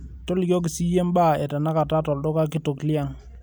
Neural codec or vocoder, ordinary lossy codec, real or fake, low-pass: none; none; real; none